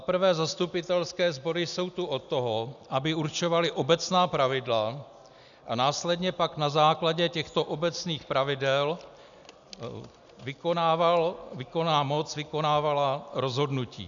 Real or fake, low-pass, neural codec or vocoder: real; 7.2 kHz; none